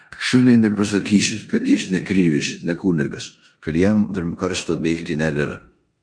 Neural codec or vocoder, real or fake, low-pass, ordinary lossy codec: codec, 16 kHz in and 24 kHz out, 0.9 kbps, LongCat-Audio-Codec, four codebook decoder; fake; 9.9 kHz; MP3, 64 kbps